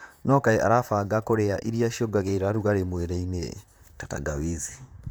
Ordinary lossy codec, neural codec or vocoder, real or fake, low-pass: none; vocoder, 44.1 kHz, 128 mel bands, Pupu-Vocoder; fake; none